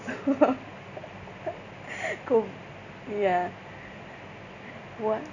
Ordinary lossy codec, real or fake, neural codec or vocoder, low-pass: none; real; none; 7.2 kHz